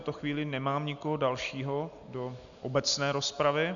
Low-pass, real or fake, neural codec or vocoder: 7.2 kHz; real; none